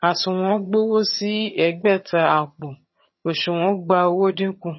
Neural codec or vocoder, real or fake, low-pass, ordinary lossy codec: vocoder, 22.05 kHz, 80 mel bands, HiFi-GAN; fake; 7.2 kHz; MP3, 24 kbps